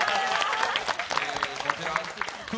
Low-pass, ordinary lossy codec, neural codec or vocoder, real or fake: none; none; none; real